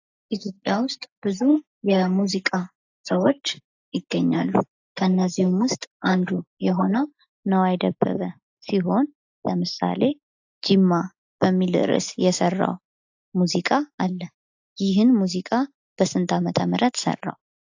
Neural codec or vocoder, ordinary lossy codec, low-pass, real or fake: none; AAC, 48 kbps; 7.2 kHz; real